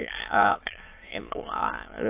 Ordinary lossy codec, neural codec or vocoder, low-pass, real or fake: AAC, 24 kbps; autoencoder, 22.05 kHz, a latent of 192 numbers a frame, VITS, trained on many speakers; 3.6 kHz; fake